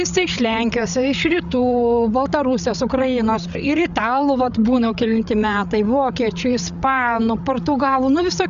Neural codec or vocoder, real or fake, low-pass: codec, 16 kHz, 8 kbps, FreqCodec, larger model; fake; 7.2 kHz